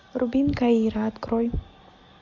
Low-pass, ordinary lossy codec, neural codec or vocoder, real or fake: 7.2 kHz; MP3, 64 kbps; none; real